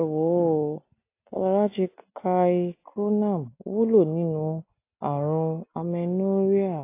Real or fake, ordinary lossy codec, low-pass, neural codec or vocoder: real; AAC, 24 kbps; 3.6 kHz; none